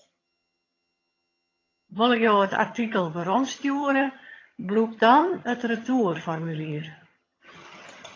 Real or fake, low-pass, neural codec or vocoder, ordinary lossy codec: fake; 7.2 kHz; vocoder, 22.05 kHz, 80 mel bands, HiFi-GAN; AAC, 32 kbps